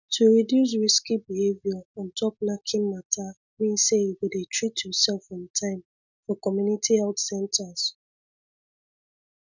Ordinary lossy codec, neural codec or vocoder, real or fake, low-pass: none; none; real; 7.2 kHz